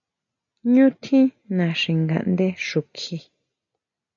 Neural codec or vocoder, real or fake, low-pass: none; real; 7.2 kHz